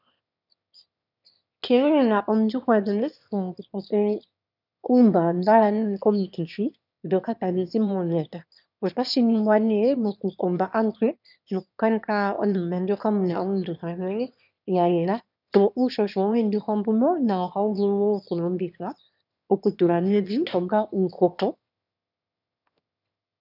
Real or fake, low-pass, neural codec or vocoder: fake; 5.4 kHz; autoencoder, 22.05 kHz, a latent of 192 numbers a frame, VITS, trained on one speaker